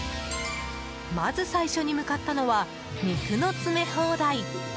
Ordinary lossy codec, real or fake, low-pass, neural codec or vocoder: none; real; none; none